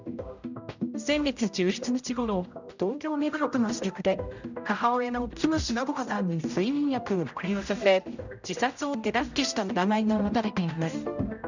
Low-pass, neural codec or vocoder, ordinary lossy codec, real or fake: 7.2 kHz; codec, 16 kHz, 0.5 kbps, X-Codec, HuBERT features, trained on general audio; none; fake